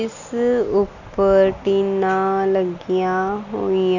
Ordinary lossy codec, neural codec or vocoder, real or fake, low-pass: none; none; real; 7.2 kHz